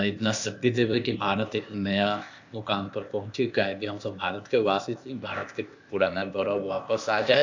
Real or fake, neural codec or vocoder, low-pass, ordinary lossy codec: fake; codec, 16 kHz, 0.8 kbps, ZipCodec; 7.2 kHz; AAC, 48 kbps